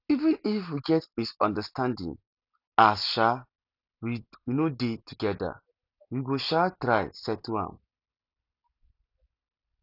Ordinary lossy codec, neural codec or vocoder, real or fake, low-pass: none; none; real; 5.4 kHz